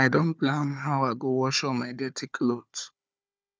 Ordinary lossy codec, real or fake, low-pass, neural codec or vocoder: none; fake; none; codec, 16 kHz, 4 kbps, FunCodec, trained on Chinese and English, 50 frames a second